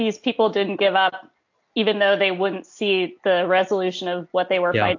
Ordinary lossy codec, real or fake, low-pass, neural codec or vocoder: AAC, 48 kbps; real; 7.2 kHz; none